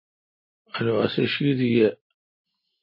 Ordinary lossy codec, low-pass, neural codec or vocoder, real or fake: MP3, 24 kbps; 5.4 kHz; none; real